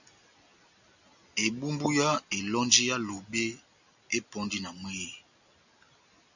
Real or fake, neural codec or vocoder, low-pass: real; none; 7.2 kHz